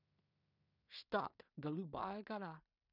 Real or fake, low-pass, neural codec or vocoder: fake; 5.4 kHz; codec, 16 kHz in and 24 kHz out, 0.4 kbps, LongCat-Audio-Codec, two codebook decoder